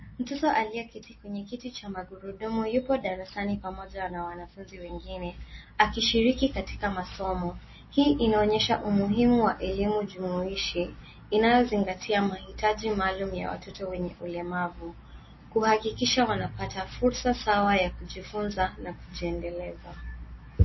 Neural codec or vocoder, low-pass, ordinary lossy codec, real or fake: none; 7.2 kHz; MP3, 24 kbps; real